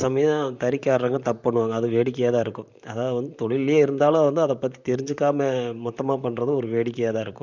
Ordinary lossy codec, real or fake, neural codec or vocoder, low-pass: none; fake; vocoder, 44.1 kHz, 128 mel bands, Pupu-Vocoder; 7.2 kHz